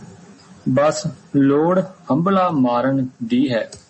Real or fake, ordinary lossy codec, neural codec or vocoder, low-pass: real; MP3, 32 kbps; none; 9.9 kHz